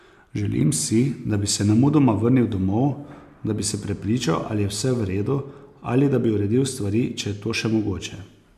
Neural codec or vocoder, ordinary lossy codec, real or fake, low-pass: none; MP3, 96 kbps; real; 14.4 kHz